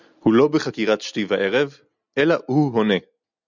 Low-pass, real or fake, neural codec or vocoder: 7.2 kHz; real; none